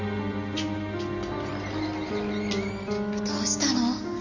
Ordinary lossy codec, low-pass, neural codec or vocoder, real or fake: none; 7.2 kHz; none; real